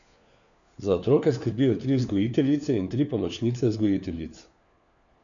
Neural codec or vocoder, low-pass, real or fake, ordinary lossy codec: codec, 16 kHz, 4 kbps, FunCodec, trained on LibriTTS, 50 frames a second; 7.2 kHz; fake; none